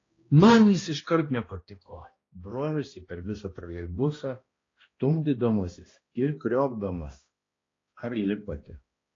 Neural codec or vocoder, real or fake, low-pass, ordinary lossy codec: codec, 16 kHz, 1 kbps, X-Codec, HuBERT features, trained on balanced general audio; fake; 7.2 kHz; AAC, 32 kbps